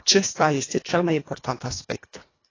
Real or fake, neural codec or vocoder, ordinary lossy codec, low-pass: fake; codec, 24 kHz, 1.5 kbps, HILCodec; AAC, 32 kbps; 7.2 kHz